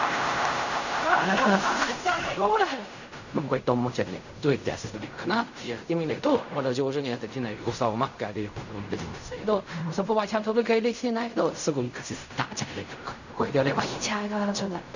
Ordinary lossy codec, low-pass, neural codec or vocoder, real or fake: none; 7.2 kHz; codec, 16 kHz in and 24 kHz out, 0.4 kbps, LongCat-Audio-Codec, fine tuned four codebook decoder; fake